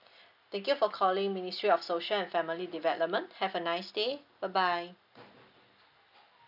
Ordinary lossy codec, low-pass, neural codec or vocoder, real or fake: none; 5.4 kHz; none; real